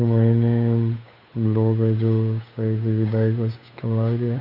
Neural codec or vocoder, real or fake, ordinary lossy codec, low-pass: codec, 16 kHz, 8 kbps, FunCodec, trained on Chinese and English, 25 frames a second; fake; none; 5.4 kHz